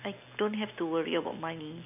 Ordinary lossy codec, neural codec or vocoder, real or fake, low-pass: none; none; real; 3.6 kHz